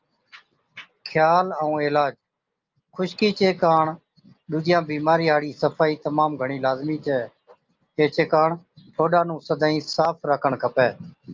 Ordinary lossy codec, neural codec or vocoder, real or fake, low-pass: Opus, 24 kbps; none; real; 7.2 kHz